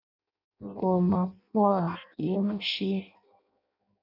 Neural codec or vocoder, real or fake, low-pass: codec, 16 kHz in and 24 kHz out, 0.6 kbps, FireRedTTS-2 codec; fake; 5.4 kHz